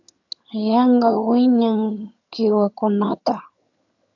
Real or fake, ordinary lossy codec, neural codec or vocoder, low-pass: fake; AAC, 48 kbps; vocoder, 22.05 kHz, 80 mel bands, HiFi-GAN; 7.2 kHz